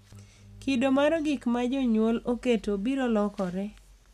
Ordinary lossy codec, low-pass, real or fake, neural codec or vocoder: none; 14.4 kHz; real; none